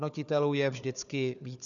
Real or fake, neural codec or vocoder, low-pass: fake; codec, 16 kHz, 4 kbps, FunCodec, trained on Chinese and English, 50 frames a second; 7.2 kHz